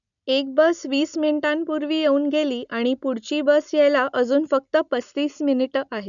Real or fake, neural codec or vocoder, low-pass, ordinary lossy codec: real; none; 7.2 kHz; none